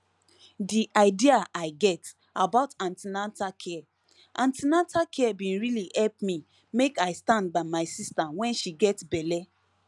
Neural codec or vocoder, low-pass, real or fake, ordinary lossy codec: none; none; real; none